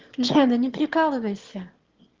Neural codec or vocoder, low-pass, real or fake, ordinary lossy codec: autoencoder, 22.05 kHz, a latent of 192 numbers a frame, VITS, trained on one speaker; 7.2 kHz; fake; Opus, 16 kbps